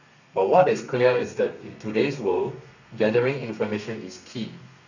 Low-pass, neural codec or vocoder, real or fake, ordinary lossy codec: 7.2 kHz; codec, 32 kHz, 1.9 kbps, SNAC; fake; none